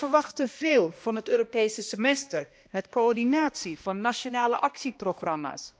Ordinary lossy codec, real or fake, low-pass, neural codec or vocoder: none; fake; none; codec, 16 kHz, 1 kbps, X-Codec, HuBERT features, trained on balanced general audio